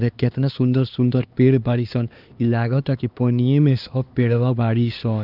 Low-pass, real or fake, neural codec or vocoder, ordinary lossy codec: 5.4 kHz; fake; codec, 16 kHz, 2 kbps, X-Codec, HuBERT features, trained on LibriSpeech; Opus, 24 kbps